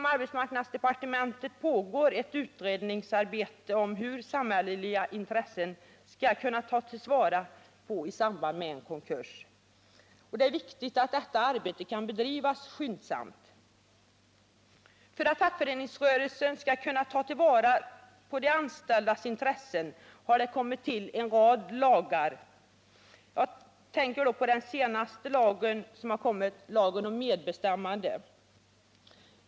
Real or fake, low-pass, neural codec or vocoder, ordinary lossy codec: real; none; none; none